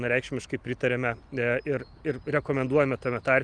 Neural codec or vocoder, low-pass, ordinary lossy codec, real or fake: none; 9.9 kHz; Opus, 32 kbps; real